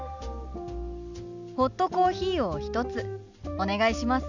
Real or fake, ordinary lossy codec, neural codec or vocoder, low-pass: real; none; none; 7.2 kHz